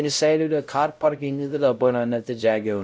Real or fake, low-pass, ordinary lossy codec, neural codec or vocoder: fake; none; none; codec, 16 kHz, 0.5 kbps, X-Codec, WavLM features, trained on Multilingual LibriSpeech